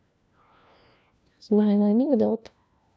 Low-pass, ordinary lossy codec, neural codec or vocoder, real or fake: none; none; codec, 16 kHz, 1 kbps, FunCodec, trained on LibriTTS, 50 frames a second; fake